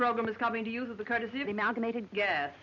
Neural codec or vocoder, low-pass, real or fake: none; 7.2 kHz; real